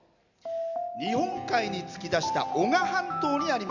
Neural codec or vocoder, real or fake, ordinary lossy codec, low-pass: none; real; none; 7.2 kHz